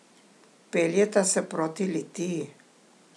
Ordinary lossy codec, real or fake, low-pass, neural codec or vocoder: none; real; none; none